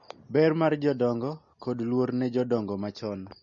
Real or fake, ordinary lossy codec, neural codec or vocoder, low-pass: real; MP3, 32 kbps; none; 7.2 kHz